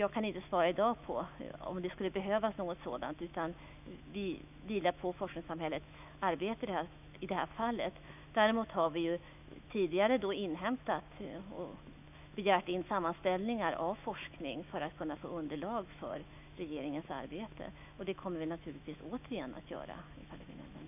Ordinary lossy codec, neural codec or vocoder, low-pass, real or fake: none; autoencoder, 48 kHz, 128 numbers a frame, DAC-VAE, trained on Japanese speech; 3.6 kHz; fake